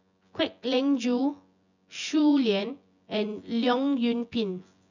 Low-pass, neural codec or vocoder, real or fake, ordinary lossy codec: 7.2 kHz; vocoder, 24 kHz, 100 mel bands, Vocos; fake; none